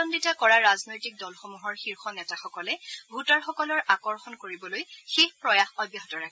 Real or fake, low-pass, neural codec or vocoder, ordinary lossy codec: real; none; none; none